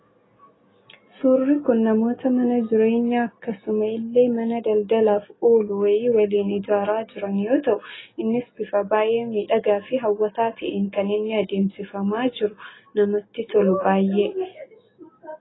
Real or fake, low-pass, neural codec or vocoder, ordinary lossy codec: real; 7.2 kHz; none; AAC, 16 kbps